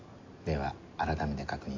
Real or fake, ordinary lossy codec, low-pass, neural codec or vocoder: real; MP3, 64 kbps; 7.2 kHz; none